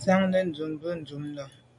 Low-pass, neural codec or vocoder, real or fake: 10.8 kHz; none; real